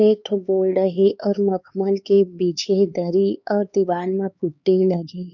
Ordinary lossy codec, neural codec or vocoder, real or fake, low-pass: none; codec, 16 kHz, 4 kbps, X-Codec, HuBERT features, trained on LibriSpeech; fake; 7.2 kHz